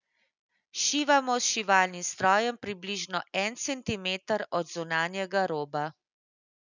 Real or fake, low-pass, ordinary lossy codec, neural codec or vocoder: real; 7.2 kHz; none; none